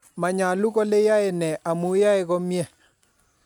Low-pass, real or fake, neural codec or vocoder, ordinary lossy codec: 19.8 kHz; real; none; none